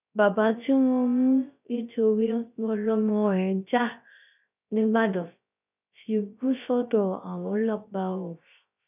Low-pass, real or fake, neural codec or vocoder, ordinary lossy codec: 3.6 kHz; fake; codec, 16 kHz, 0.3 kbps, FocalCodec; none